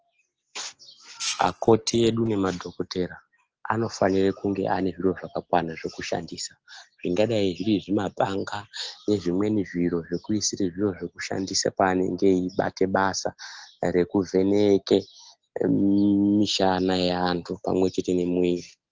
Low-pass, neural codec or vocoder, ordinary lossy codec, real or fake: 7.2 kHz; none; Opus, 16 kbps; real